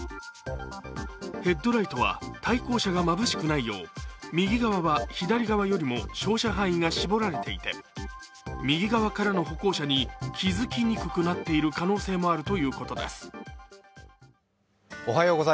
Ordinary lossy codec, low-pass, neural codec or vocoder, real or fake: none; none; none; real